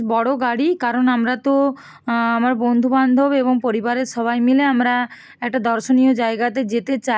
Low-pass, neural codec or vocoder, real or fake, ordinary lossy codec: none; none; real; none